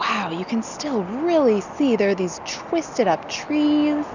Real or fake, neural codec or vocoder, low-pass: real; none; 7.2 kHz